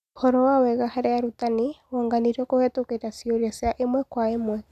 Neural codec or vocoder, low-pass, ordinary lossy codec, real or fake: none; 14.4 kHz; none; real